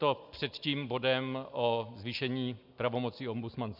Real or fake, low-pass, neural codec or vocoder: real; 5.4 kHz; none